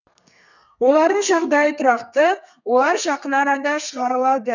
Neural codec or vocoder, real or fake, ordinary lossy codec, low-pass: codec, 32 kHz, 1.9 kbps, SNAC; fake; none; 7.2 kHz